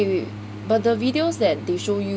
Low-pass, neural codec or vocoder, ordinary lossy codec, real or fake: none; none; none; real